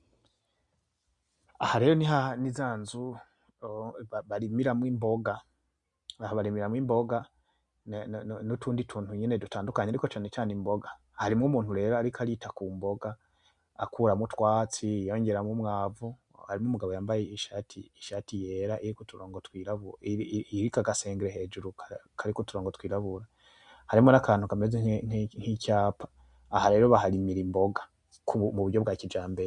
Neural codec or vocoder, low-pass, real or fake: none; 10.8 kHz; real